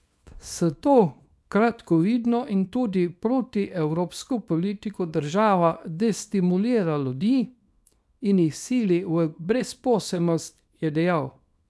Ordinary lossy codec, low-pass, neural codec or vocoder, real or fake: none; none; codec, 24 kHz, 0.9 kbps, WavTokenizer, small release; fake